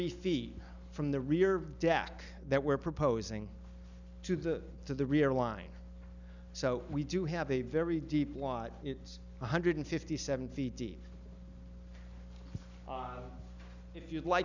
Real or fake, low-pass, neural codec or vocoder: real; 7.2 kHz; none